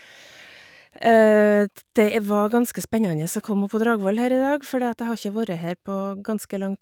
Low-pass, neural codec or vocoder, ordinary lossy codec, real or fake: 19.8 kHz; codec, 44.1 kHz, 7.8 kbps, DAC; none; fake